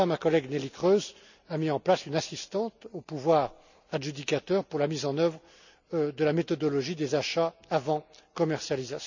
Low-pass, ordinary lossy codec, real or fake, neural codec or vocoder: 7.2 kHz; none; real; none